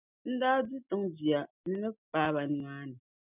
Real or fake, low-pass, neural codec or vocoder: real; 3.6 kHz; none